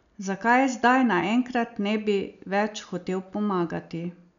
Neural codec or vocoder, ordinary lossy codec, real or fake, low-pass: none; none; real; 7.2 kHz